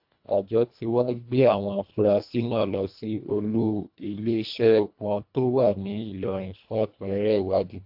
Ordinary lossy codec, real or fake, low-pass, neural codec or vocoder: AAC, 48 kbps; fake; 5.4 kHz; codec, 24 kHz, 1.5 kbps, HILCodec